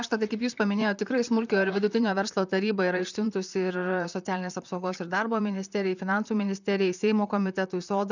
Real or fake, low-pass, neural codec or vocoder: fake; 7.2 kHz; vocoder, 44.1 kHz, 128 mel bands, Pupu-Vocoder